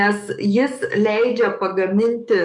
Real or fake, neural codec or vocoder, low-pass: fake; codec, 44.1 kHz, 7.8 kbps, DAC; 10.8 kHz